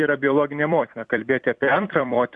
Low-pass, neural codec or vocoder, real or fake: 10.8 kHz; vocoder, 44.1 kHz, 128 mel bands every 256 samples, BigVGAN v2; fake